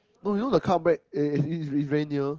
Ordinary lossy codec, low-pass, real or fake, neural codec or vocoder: Opus, 16 kbps; 7.2 kHz; real; none